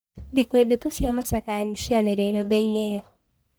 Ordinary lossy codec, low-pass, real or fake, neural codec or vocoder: none; none; fake; codec, 44.1 kHz, 1.7 kbps, Pupu-Codec